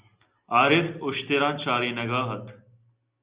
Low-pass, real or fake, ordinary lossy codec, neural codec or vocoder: 3.6 kHz; real; Opus, 64 kbps; none